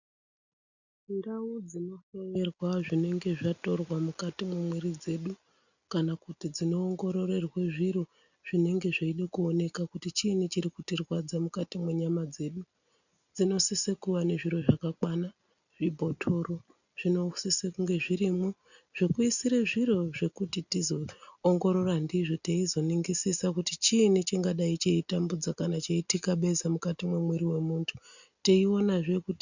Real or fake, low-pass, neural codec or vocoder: real; 7.2 kHz; none